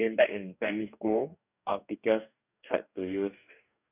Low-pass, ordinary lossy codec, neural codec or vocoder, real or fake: 3.6 kHz; none; codec, 44.1 kHz, 2.6 kbps, DAC; fake